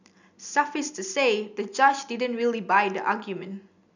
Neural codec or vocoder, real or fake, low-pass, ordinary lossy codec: none; real; 7.2 kHz; none